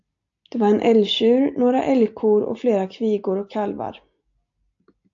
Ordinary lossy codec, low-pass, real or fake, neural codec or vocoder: AAC, 64 kbps; 7.2 kHz; real; none